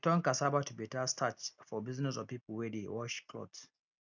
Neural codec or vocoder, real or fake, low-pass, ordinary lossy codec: none; real; 7.2 kHz; none